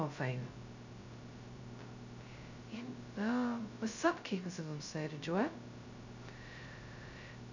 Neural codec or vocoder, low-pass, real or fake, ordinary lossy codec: codec, 16 kHz, 0.2 kbps, FocalCodec; 7.2 kHz; fake; none